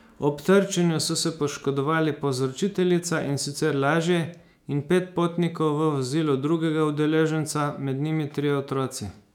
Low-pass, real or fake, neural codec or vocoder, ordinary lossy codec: 19.8 kHz; fake; autoencoder, 48 kHz, 128 numbers a frame, DAC-VAE, trained on Japanese speech; none